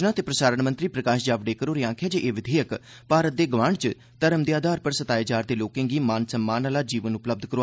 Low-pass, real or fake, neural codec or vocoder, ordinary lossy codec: none; real; none; none